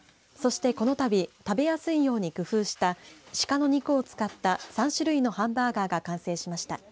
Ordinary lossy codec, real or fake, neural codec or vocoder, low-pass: none; real; none; none